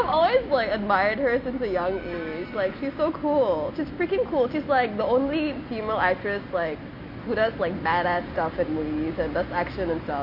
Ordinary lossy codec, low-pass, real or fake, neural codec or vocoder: none; 5.4 kHz; real; none